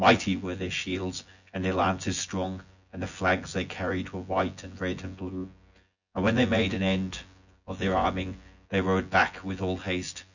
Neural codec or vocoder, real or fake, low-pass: vocoder, 24 kHz, 100 mel bands, Vocos; fake; 7.2 kHz